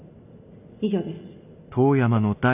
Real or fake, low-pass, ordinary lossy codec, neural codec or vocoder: real; 3.6 kHz; none; none